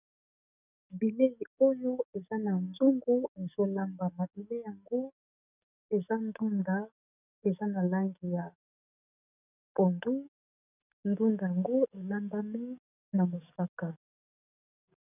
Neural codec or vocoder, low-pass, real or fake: codec, 44.1 kHz, 7.8 kbps, DAC; 3.6 kHz; fake